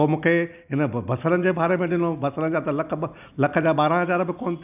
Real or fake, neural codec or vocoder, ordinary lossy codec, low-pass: real; none; none; 3.6 kHz